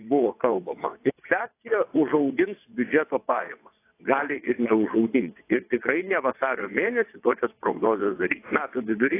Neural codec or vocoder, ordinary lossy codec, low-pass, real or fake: vocoder, 22.05 kHz, 80 mel bands, WaveNeXt; AAC, 24 kbps; 3.6 kHz; fake